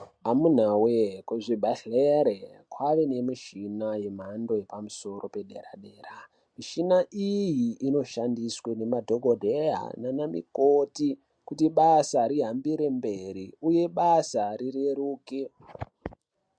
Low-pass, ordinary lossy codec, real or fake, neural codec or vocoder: 9.9 kHz; MP3, 64 kbps; real; none